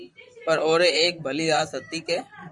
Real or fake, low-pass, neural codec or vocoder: fake; 10.8 kHz; vocoder, 44.1 kHz, 128 mel bands, Pupu-Vocoder